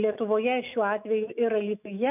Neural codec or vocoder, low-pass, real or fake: autoencoder, 48 kHz, 128 numbers a frame, DAC-VAE, trained on Japanese speech; 3.6 kHz; fake